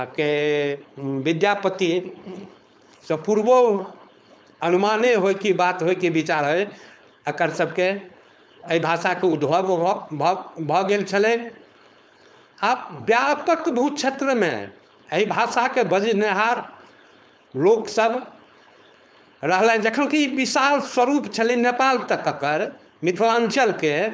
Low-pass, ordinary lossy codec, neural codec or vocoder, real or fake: none; none; codec, 16 kHz, 4.8 kbps, FACodec; fake